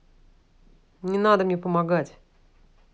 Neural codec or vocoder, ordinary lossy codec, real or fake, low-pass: none; none; real; none